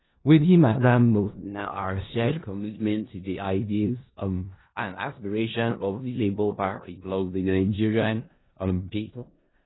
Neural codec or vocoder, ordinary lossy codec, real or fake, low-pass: codec, 16 kHz in and 24 kHz out, 0.4 kbps, LongCat-Audio-Codec, four codebook decoder; AAC, 16 kbps; fake; 7.2 kHz